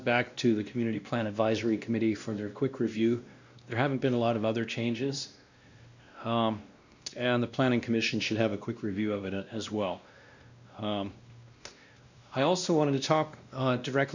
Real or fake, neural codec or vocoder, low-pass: fake; codec, 16 kHz, 1 kbps, X-Codec, WavLM features, trained on Multilingual LibriSpeech; 7.2 kHz